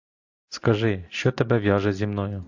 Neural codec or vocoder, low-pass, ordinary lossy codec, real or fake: none; 7.2 kHz; AAC, 48 kbps; real